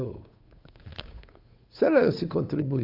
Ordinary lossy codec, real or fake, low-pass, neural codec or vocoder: AAC, 32 kbps; fake; 5.4 kHz; codec, 16 kHz, 8 kbps, FunCodec, trained on Chinese and English, 25 frames a second